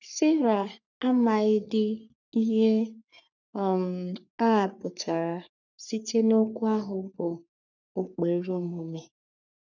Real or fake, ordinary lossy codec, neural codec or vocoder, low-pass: fake; none; codec, 44.1 kHz, 3.4 kbps, Pupu-Codec; 7.2 kHz